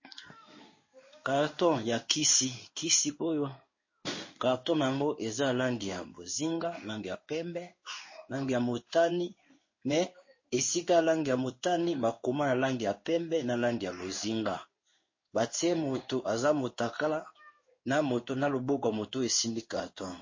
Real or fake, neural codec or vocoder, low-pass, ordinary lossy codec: fake; codec, 16 kHz in and 24 kHz out, 1 kbps, XY-Tokenizer; 7.2 kHz; MP3, 32 kbps